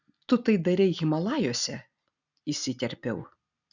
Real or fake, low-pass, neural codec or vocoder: real; 7.2 kHz; none